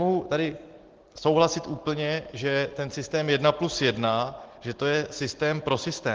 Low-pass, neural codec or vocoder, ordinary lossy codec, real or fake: 7.2 kHz; none; Opus, 16 kbps; real